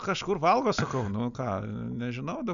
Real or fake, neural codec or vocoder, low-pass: real; none; 7.2 kHz